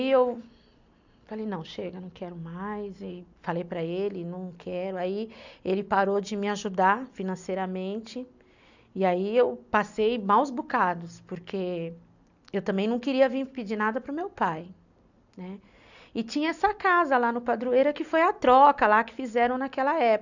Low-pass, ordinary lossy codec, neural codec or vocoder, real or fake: 7.2 kHz; none; none; real